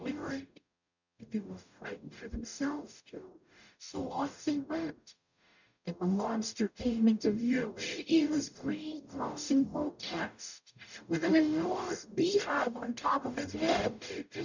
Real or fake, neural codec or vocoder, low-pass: fake; codec, 44.1 kHz, 0.9 kbps, DAC; 7.2 kHz